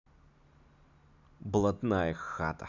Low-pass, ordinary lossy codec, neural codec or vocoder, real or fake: 7.2 kHz; none; none; real